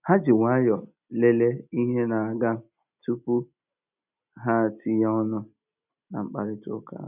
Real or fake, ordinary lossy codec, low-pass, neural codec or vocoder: real; none; 3.6 kHz; none